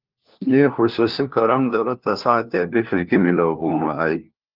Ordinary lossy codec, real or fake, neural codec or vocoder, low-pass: Opus, 32 kbps; fake; codec, 16 kHz, 1 kbps, FunCodec, trained on LibriTTS, 50 frames a second; 5.4 kHz